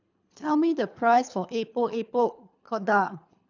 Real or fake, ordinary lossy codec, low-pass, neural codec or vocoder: fake; none; 7.2 kHz; codec, 24 kHz, 3 kbps, HILCodec